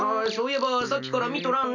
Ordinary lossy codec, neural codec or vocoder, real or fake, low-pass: none; none; real; 7.2 kHz